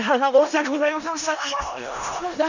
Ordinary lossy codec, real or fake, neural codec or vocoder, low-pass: none; fake; codec, 16 kHz in and 24 kHz out, 0.4 kbps, LongCat-Audio-Codec, four codebook decoder; 7.2 kHz